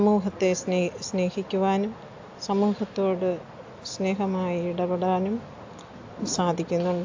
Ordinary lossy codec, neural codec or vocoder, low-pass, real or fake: AAC, 48 kbps; none; 7.2 kHz; real